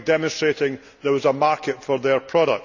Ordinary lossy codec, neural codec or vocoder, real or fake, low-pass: none; none; real; 7.2 kHz